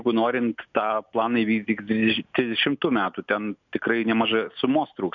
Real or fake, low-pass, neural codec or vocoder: real; 7.2 kHz; none